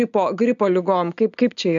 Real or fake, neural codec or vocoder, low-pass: real; none; 7.2 kHz